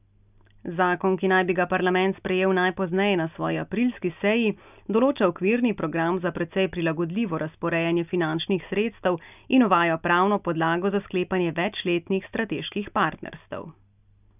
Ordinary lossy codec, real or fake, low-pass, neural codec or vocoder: none; real; 3.6 kHz; none